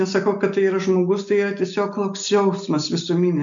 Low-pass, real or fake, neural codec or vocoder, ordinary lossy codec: 7.2 kHz; real; none; MP3, 64 kbps